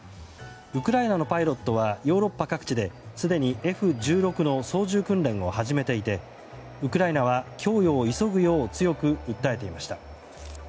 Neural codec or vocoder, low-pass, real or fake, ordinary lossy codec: none; none; real; none